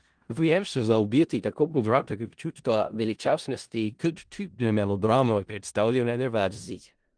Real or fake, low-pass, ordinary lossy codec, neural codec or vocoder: fake; 9.9 kHz; Opus, 24 kbps; codec, 16 kHz in and 24 kHz out, 0.4 kbps, LongCat-Audio-Codec, four codebook decoder